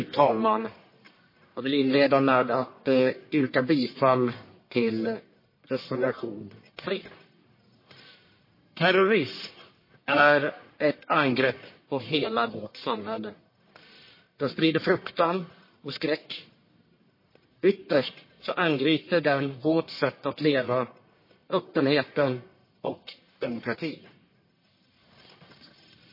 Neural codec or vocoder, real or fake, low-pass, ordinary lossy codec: codec, 44.1 kHz, 1.7 kbps, Pupu-Codec; fake; 5.4 kHz; MP3, 24 kbps